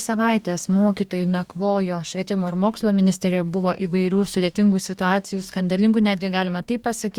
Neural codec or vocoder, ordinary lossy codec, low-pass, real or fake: codec, 44.1 kHz, 2.6 kbps, DAC; Opus, 64 kbps; 19.8 kHz; fake